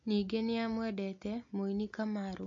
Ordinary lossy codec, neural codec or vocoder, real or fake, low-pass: MP3, 48 kbps; none; real; 7.2 kHz